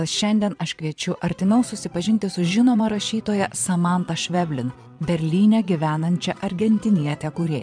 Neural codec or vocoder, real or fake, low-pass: vocoder, 22.05 kHz, 80 mel bands, Vocos; fake; 9.9 kHz